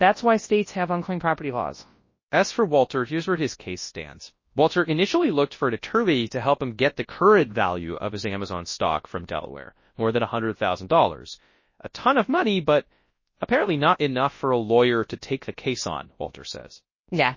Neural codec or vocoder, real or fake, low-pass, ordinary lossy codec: codec, 24 kHz, 0.9 kbps, WavTokenizer, large speech release; fake; 7.2 kHz; MP3, 32 kbps